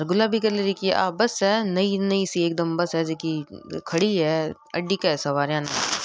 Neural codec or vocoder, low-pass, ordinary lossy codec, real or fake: none; none; none; real